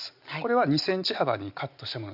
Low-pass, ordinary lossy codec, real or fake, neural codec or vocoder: 5.4 kHz; none; real; none